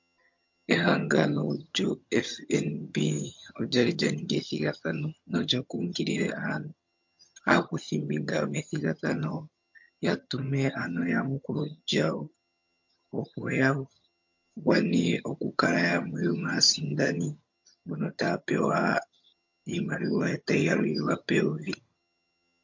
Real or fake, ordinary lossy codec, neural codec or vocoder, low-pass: fake; MP3, 48 kbps; vocoder, 22.05 kHz, 80 mel bands, HiFi-GAN; 7.2 kHz